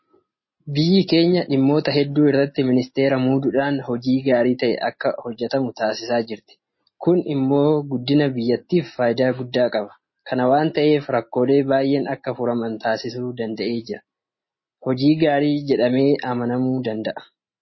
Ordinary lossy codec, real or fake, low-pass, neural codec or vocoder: MP3, 24 kbps; real; 7.2 kHz; none